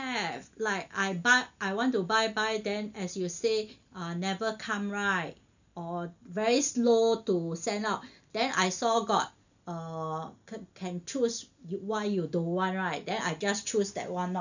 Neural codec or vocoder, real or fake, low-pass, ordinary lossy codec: none; real; 7.2 kHz; none